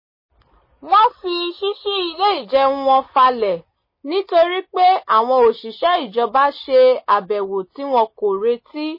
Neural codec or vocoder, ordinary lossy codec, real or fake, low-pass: none; MP3, 24 kbps; real; 5.4 kHz